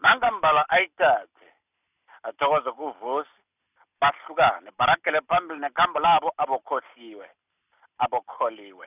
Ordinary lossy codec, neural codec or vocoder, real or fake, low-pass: none; none; real; 3.6 kHz